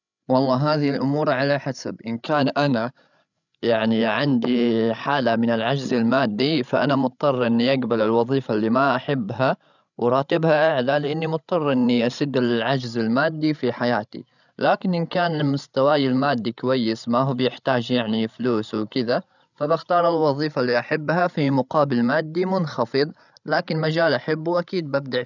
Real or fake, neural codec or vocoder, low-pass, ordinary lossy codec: fake; codec, 16 kHz, 16 kbps, FreqCodec, larger model; 7.2 kHz; none